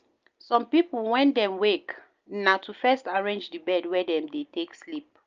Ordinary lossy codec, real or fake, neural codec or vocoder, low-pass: Opus, 24 kbps; real; none; 7.2 kHz